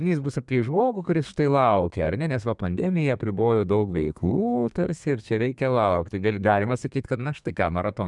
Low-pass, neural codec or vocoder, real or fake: 10.8 kHz; codec, 32 kHz, 1.9 kbps, SNAC; fake